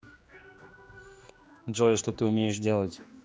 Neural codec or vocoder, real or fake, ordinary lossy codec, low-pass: codec, 16 kHz, 2 kbps, X-Codec, HuBERT features, trained on general audio; fake; none; none